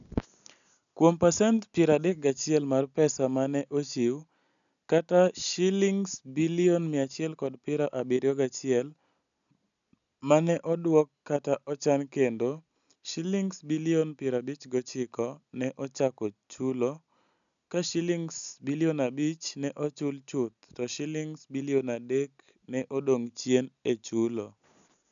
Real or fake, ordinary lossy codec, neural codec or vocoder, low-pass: real; none; none; 7.2 kHz